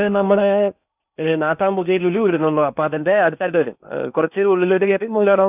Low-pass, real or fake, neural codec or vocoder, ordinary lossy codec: 3.6 kHz; fake; codec, 16 kHz in and 24 kHz out, 0.8 kbps, FocalCodec, streaming, 65536 codes; none